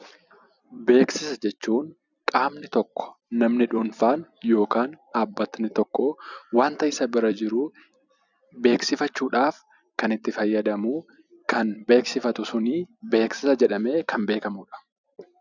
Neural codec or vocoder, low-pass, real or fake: vocoder, 44.1 kHz, 128 mel bands every 256 samples, BigVGAN v2; 7.2 kHz; fake